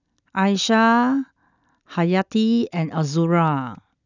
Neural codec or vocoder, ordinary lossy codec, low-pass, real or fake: none; none; 7.2 kHz; real